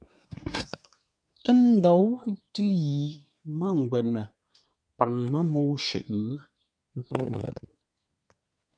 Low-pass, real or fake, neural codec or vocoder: 9.9 kHz; fake; codec, 24 kHz, 1 kbps, SNAC